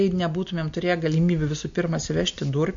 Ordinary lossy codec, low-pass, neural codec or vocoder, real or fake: MP3, 48 kbps; 7.2 kHz; none; real